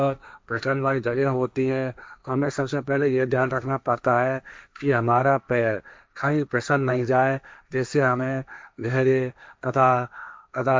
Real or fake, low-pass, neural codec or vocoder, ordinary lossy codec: fake; none; codec, 16 kHz, 1.1 kbps, Voila-Tokenizer; none